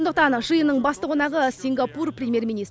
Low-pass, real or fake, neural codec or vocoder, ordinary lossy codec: none; real; none; none